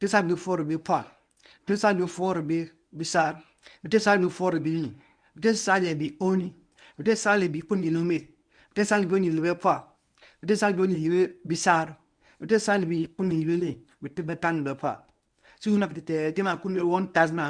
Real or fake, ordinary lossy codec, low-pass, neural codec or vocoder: fake; none; 9.9 kHz; codec, 24 kHz, 0.9 kbps, WavTokenizer, medium speech release version 1